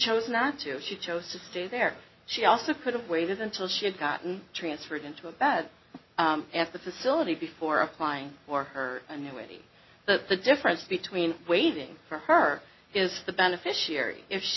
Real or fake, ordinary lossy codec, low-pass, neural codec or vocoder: real; MP3, 24 kbps; 7.2 kHz; none